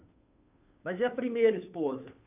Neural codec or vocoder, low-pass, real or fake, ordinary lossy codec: codec, 16 kHz, 2 kbps, FunCodec, trained on Chinese and English, 25 frames a second; 3.6 kHz; fake; AAC, 32 kbps